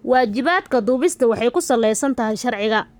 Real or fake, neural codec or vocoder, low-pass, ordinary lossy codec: fake; codec, 44.1 kHz, 7.8 kbps, Pupu-Codec; none; none